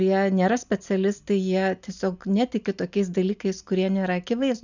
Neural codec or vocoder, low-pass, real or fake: none; 7.2 kHz; real